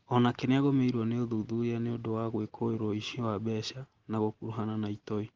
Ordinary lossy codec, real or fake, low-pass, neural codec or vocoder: Opus, 16 kbps; real; 7.2 kHz; none